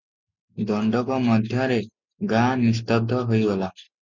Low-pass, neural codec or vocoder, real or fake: 7.2 kHz; none; real